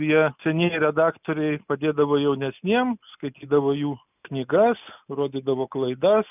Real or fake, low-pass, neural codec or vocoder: real; 3.6 kHz; none